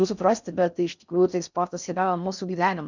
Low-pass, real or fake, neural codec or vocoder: 7.2 kHz; fake; codec, 16 kHz in and 24 kHz out, 0.6 kbps, FocalCodec, streaming, 4096 codes